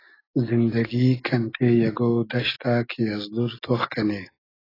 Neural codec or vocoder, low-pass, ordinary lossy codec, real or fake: none; 5.4 kHz; AAC, 24 kbps; real